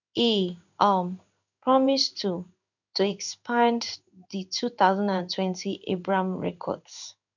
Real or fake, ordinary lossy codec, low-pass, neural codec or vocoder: fake; none; 7.2 kHz; codec, 16 kHz in and 24 kHz out, 1 kbps, XY-Tokenizer